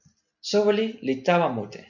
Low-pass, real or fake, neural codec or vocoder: 7.2 kHz; real; none